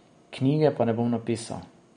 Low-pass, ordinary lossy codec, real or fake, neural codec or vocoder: 9.9 kHz; MP3, 48 kbps; real; none